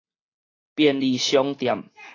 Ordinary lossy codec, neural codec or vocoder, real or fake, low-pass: AAC, 32 kbps; none; real; 7.2 kHz